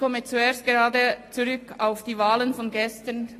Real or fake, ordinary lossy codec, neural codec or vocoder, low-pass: real; AAC, 48 kbps; none; 14.4 kHz